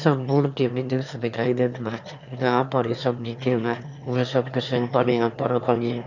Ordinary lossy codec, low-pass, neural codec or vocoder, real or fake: none; 7.2 kHz; autoencoder, 22.05 kHz, a latent of 192 numbers a frame, VITS, trained on one speaker; fake